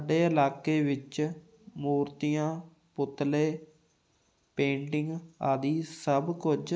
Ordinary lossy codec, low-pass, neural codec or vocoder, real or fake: none; none; none; real